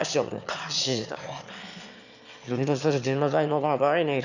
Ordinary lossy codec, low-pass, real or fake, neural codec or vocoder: none; 7.2 kHz; fake; autoencoder, 22.05 kHz, a latent of 192 numbers a frame, VITS, trained on one speaker